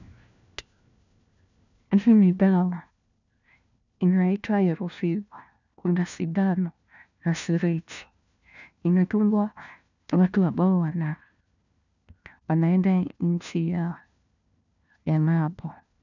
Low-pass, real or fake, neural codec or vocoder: 7.2 kHz; fake; codec, 16 kHz, 1 kbps, FunCodec, trained on LibriTTS, 50 frames a second